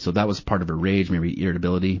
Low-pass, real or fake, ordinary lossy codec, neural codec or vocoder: 7.2 kHz; real; MP3, 32 kbps; none